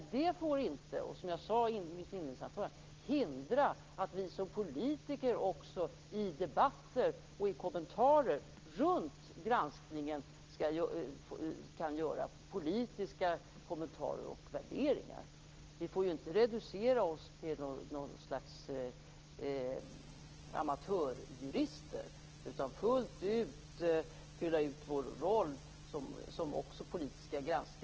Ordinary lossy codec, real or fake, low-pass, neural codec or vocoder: Opus, 16 kbps; real; 7.2 kHz; none